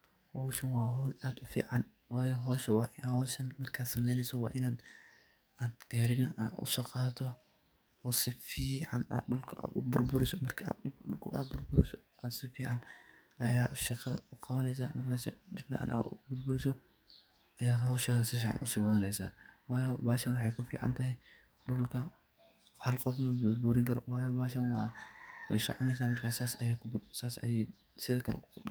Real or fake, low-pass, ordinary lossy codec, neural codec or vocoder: fake; none; none; codec, 44.1 kHz, 2.6 kbps, SNAC